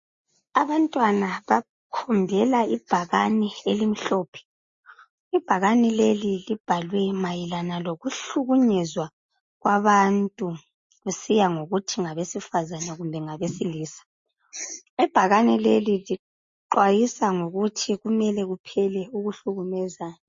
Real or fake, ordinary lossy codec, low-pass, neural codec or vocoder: real; MP3, 32 kbps; 7.2 kHz; none